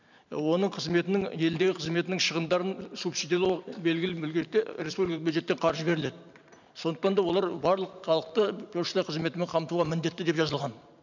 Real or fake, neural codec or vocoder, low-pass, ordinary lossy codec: fake; vocoder, 44.1 kHz, 128 mel bands every 512 samples, BigVGAN v2; 7.2 kHz; none